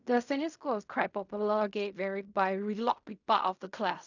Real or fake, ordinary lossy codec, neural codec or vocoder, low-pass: fake; none; codec, 16 kHz in and 24 kHz out, 0.4 kbps, LongCat-Audio-Codec, fine tuned four codebook decoder; 7.2 kHz